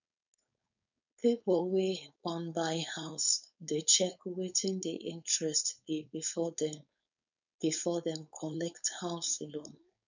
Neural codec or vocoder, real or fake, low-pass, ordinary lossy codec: codec, 16 kHz, 4.8 kbps, FACodec; fake; 7.2 kHz; none